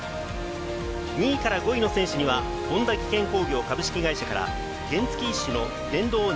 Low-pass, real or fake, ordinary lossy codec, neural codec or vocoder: none; real; none; none